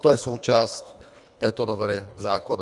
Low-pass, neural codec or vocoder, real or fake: 10.8 kHz; codec, 24 kHz, 1.5 kbps, HILCodec; fake